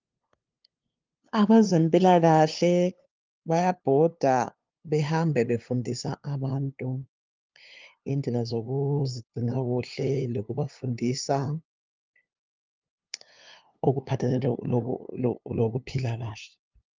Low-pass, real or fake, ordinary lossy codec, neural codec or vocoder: 7.2 kHz; fake; Opus, 24 kbps; codec, 16 kHz, 2 kbps, FunCodec, trained on LibriTTS, 25 frames a second